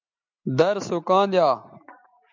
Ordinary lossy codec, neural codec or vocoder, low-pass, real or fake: MP3, 64 kbps; none; 7.2 kHz; real